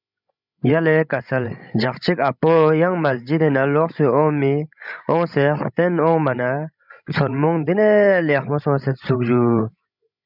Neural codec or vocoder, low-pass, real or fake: codec, 16 kHz, 16 kbps, FreqCodec, larger model; 5.4 kHz; fake